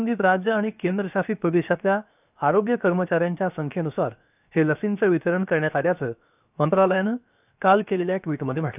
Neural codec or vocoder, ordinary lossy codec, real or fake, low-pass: codec, 16 kHz, 0.7 kbps, FocalCodec; none; fake; 3.6 kHz